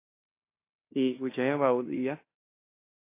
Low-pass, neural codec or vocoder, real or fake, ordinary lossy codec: 3.6 kHz; codec, 16 kHz in and 24 kHz out, 0.9 kbps, LongCat-Audio-Codec, fine tuned four codebook decoder; fake; AAC, 24 kbps